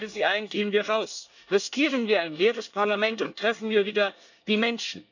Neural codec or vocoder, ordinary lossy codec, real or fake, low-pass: codec, 24 kHz, 1 kbps, SNAC; none; fake; 7.2 kHz